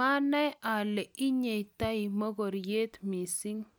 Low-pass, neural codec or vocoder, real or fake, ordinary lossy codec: none; none; real; none